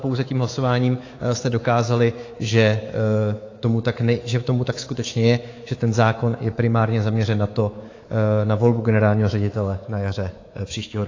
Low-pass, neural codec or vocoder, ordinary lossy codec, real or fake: 7.2 kHz; codec, 24 kHz, 3.1 kbps, DualCodec; AAC, 32 kbps; fake